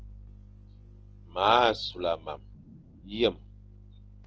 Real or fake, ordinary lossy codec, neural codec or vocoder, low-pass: real; Opus, 16 kbps; none; 7.2 kHz